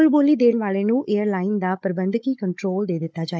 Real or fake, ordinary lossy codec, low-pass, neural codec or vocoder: fake; none; none; codec, 16 kHz, 16 kbps, FunCodec, trained on Chinese and English, 50 frames a second